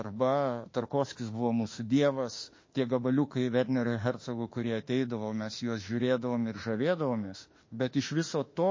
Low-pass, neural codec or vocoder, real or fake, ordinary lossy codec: 7.2 kHz; autoencoder, 48 kHz, 32 numbers a frame, DAC-VAE, trained on Japanese speech; fake; MP3, 32 kbps